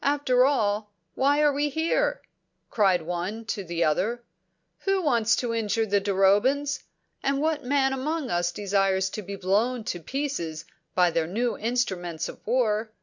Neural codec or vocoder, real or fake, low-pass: none; real; 7.2 kHz